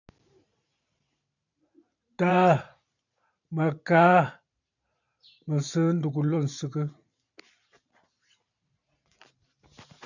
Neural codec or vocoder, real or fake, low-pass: vocoder, 44.1 kHz, 128 mel bands every 512 samples, BigVGAN v2; fake; 7.2 kHz